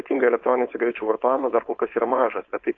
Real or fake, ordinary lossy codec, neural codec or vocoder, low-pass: fake; AAC, 48 kbps; codec, 16 kHz, 4.8 kbps, FACodec; 7.2 kHz